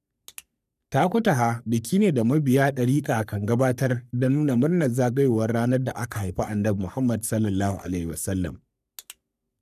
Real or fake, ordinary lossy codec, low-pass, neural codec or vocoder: fake; none; 14.4 kHz; codec, 44.1 kHz, 3.4 kbps, Pupu-Codec